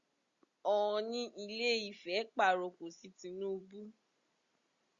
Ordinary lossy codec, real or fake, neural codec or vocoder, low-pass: Opus, 64 kbps; real; none; 7.2 kHz